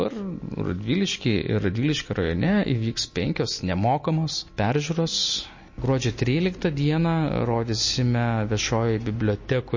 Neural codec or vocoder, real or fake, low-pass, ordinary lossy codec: none; real; 7.2 kHz; MP3, 32 kbps